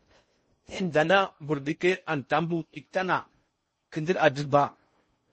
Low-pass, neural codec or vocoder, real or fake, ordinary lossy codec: 10.8 kHz; codec, 16 kHz in and 24 kHz out, 0.6 kbps, FocalCodec, streaming, 2048 codes; fake; MP3, 32 kbps